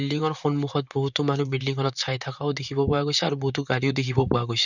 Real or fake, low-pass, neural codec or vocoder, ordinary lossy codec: fake; 7.2 kHz; vocoder, 44.1 kHz, 128 mel bands, Pupu-Vocoder; none